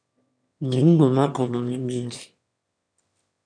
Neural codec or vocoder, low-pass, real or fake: autoencoder, 22.05 kHz, a latent of 192 numbers a frame, VITS, trained on one speaker; 9.9 kHz; fake